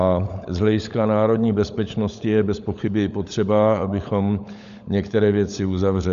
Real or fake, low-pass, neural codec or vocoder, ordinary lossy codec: fake; 7.2 kHz; codec, 16 kHz, 16 kbps, FunCodec, trained on LibriTTS, 50 frames a second; Opus, 64 kbps